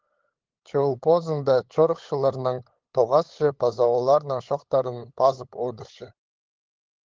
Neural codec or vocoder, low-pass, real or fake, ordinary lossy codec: codec, 16 kHz, 8 kbps, FunCodec, trained on LibriTTS, 25 frames a second; 7.2 kHz; fake; Opus, 16 kbps